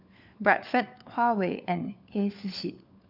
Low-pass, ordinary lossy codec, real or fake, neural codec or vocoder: 5.4 kHz; none; fake; codec, 16 kHz, 4 kbps, FunCodec, trained on LibriTTS, 50 frames a second